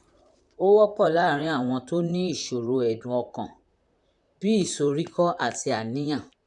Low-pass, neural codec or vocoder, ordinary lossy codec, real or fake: 10.8 kHz; vocoder, 44.1 kHz, 128 mel bands, Pupu-Vocoder; none; fake